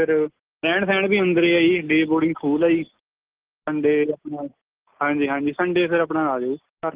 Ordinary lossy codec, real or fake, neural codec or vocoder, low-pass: Opus, 16 kbps; real; none; 3.6 kHz